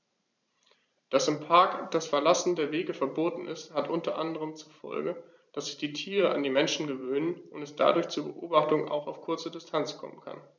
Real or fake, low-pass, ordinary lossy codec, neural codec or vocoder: fake; 7.2 kHz; none; vocoder, 44.1 kHz, 128 mel bands every 512 samples, BigVGAN v2